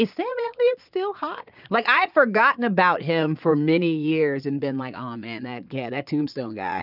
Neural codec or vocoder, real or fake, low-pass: vocoder, 22.05 kHz, 80 mel bands, Vocos; fake; 5.4 kHz